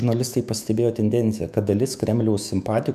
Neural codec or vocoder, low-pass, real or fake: autoencoder, 48 kHz, 128 numbers a frame, DAC-VAE, trained on Japanese speech; 14.4 kHz; fake